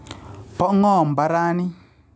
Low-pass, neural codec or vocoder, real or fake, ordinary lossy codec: none; none; real; none